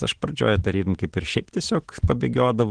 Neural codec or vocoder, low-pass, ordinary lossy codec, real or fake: none; 9.9 kHz; Opus, 16 kbps; real